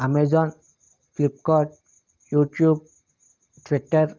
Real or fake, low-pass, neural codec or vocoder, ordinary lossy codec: real; 7.2 kHz; none; Opus, 32 kbps